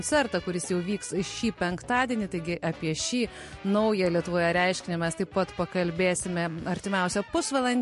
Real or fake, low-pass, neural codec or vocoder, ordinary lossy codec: real; 14.4 kHz; none; MP3, 48 kbps